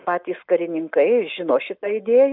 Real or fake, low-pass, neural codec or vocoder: real; 5.4 kHz; none